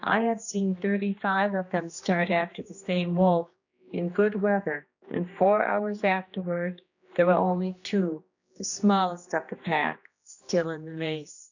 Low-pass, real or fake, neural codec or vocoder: 7.2 kHz; fake; codec, 16 kHz, 2 kbps, X-Codec, HuBERT features, trained on general audio